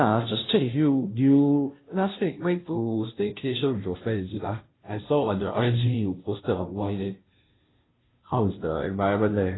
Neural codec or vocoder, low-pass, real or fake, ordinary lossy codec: codec, 16 kHz, 0.5 kbps, FunCodec, trained on Chinese and English, 25 frames a second; 7.2 kHz; fake; AAC, 16 kbps